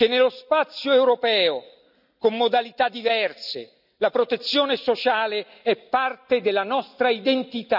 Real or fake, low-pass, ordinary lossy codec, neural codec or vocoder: real; 5.4 kHz; none; none